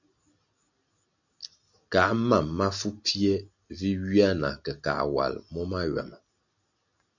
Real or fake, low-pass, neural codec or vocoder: real; 7.2 kHz; none